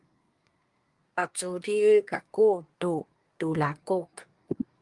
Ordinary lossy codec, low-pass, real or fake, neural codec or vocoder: Opus, 32 kbps; 10.8 kHz; fake; codec, 24 kHz, 1 kbps, SNAC